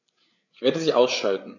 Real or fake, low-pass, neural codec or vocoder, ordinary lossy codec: fake; 7.2 kHz; codec, 16 kHz, 8 kbps, FreqCodec, larger model; none